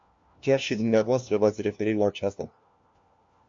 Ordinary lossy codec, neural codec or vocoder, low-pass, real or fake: MP3, 48 kbps; codec, 16 kHz, 1 kbps, FunCodec, trained on LibriTTS, 50 frames a second; 7.2 kHz; fake